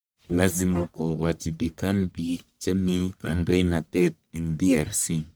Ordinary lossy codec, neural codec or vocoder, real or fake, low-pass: none; codec, 44.1 kHz, 1.7 kbps, Pupu-Codec; fake; none